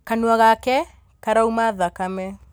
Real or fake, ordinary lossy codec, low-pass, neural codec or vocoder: real; none; none; none